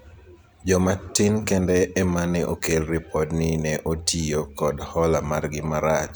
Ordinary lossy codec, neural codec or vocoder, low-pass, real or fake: none; vocoder, 44.1 kHz, 128 mel bands every 256 samples, BigVGAN v2; none; fake